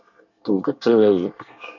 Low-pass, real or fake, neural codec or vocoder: 7.2 kHz; fake; codec, 24 kHz, 1 kbps, SNAC